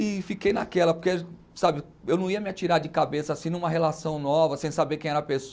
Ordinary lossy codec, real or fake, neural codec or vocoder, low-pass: none; real; none; none